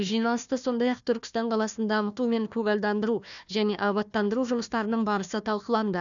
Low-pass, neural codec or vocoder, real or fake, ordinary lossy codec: 7.2 kHz; codec, 16 kHz, 1 kbps, FunCodec, trained on Chinese and English, 50 frames a second; fake; none